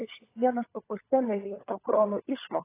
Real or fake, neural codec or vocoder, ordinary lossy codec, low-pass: fake; codec, 16 kHz, 16 kbps, FunCodec, trained on LibriTTS, 50 frames a second; AAC, 16 kbps; 3.6 kHz